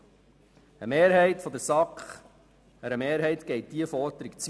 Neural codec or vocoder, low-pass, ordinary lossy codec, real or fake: none; none; none; real